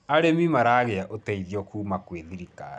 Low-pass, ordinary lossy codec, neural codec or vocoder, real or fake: none; none; none; real